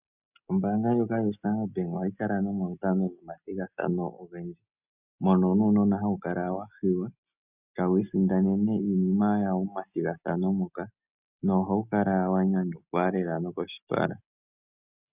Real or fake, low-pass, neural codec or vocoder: real; 3.6 kHz; none